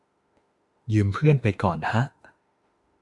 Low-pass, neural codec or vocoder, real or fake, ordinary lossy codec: 10.8 kHz; autoencoder, 48 kHz, 32 numbers a frame, DAC-VAE, trained on Japanese speech; fake; Opus, 64 kbps